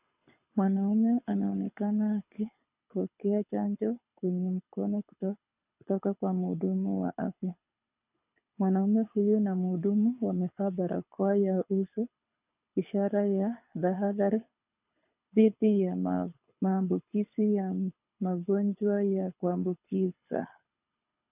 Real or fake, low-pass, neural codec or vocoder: fake; 3.6 kHz; codec, 24 kHz, 6 kbps, HILCodec